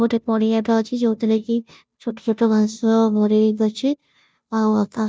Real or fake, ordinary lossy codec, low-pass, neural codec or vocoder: fake; none; none; codec, 16 kHz, 0.5 kbps, FunCodec, trained on Chinese and English, 25 frames a second